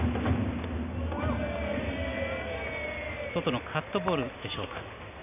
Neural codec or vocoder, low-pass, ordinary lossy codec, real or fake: none; 3.6 kHz; none; real